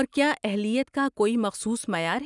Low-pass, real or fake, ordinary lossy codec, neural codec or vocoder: 10.8 kHz; real; none; none